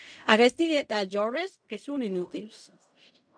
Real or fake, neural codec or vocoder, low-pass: fake; codec, 16 kHz in and 24 kHz out, 0.4 kbps, LongCat-Audio-Codec, fine tuned four codebook decoder; 9.9 kHz